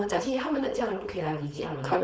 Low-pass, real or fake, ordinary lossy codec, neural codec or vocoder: none; fake; none; codec, 16 kHz, 4.8 kbps, FACodec